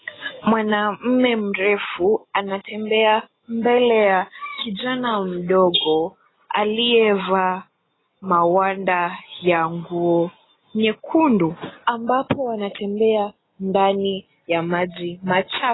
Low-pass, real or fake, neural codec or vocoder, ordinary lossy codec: 7.2 kHz; real; none; AAC, 16 kbps